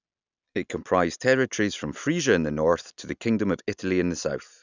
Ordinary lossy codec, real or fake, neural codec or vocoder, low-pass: none; real; none; 7.2 kHz